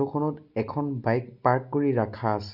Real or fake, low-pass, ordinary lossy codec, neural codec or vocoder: real; 5.4 kHz; none; none